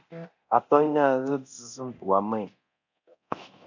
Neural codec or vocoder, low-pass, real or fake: codec, 24 kHz, 0.9 kbps, DualCodec; 7.2 kHz; fake